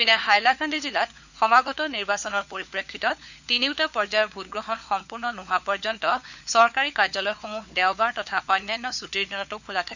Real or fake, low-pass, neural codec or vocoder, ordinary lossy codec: fake; 7.2 kHz; codec, 16 kHz, 4 kbps, FunCodec, trained on LibriTTS, 50 frames a second; none